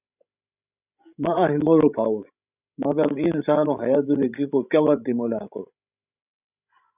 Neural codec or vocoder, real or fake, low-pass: codec, 16 kHz, 16 kbps, FreqCodec, larger model; fake; 3.6 kHz